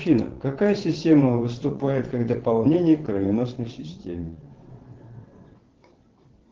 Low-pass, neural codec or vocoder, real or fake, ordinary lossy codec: 7.2 kHz; vocoder, 22.05 kHz, 80 mel bands, WaveNeXt; fake; Opus, 16 kbps